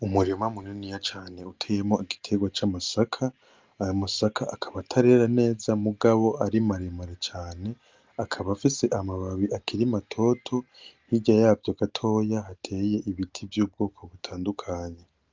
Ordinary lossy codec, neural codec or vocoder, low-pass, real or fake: Opus, 32 kbps; none; 7.2 kHz; real